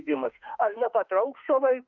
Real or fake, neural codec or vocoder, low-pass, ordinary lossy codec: fake; autoencoder, 48 kHz, 32 numbers a frame, DAC-VAE, trained on Japanese speech; 7.2 kHz; Opus, 24 kbps